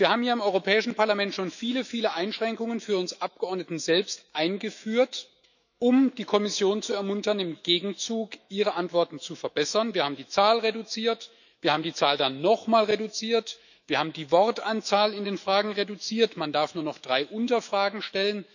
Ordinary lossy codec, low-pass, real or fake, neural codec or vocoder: none; 7.2 kHz; fake; autoencoder, 48 kHz, 128 numbers a frame, DAC-VAE, trained on Japanese speech